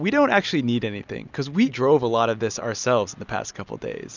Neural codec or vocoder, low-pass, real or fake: none; 7.2 kHz; real